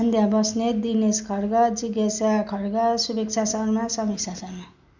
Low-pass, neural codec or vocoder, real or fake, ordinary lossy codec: 7.2 kHz; none; real; none